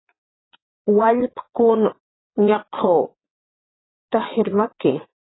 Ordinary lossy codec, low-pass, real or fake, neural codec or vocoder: AAC, 16 kbps; 7.2 kHz; fake; vocoder, 22.05 kHz, 80 mel bands, Vocos